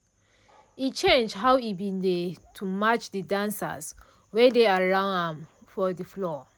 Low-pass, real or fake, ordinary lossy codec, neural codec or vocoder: none; real; none; none